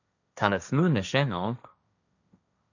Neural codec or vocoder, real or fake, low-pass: codec, 16 kHz, 1.1 kbps, Voila-Tokenizer; fake; 7.2 kHz